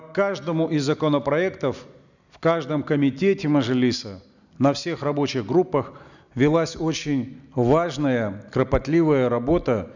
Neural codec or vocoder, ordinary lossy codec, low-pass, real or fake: none; none; 7.2 kHz; real